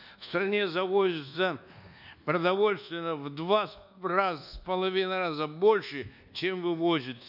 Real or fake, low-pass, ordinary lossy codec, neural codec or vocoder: fake; 5.4 kHz; none; codec, 24 kHz, 1.2 kbps, DualCodec